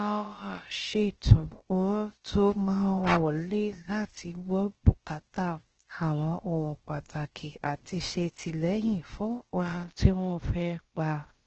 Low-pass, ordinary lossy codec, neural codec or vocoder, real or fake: 7.2 kHz; Opus, 16 kbps; codec, 16 kHz, about 1 kbps, DyCAST, with the encoder's durations; fake